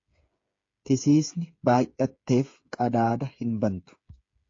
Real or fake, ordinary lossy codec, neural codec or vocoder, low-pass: fake; AAC, 48 kbps; codec, 16 kHz, 8 kbps, FreqCodec, smaller model; 7.2 kHz